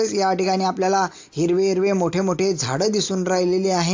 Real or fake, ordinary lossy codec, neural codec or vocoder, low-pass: real; AAC, 48 kbps; none; 7.2 kHz